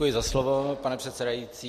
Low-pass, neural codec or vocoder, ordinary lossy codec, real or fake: 14.4 kHz; none; MP3, 64 kbps; real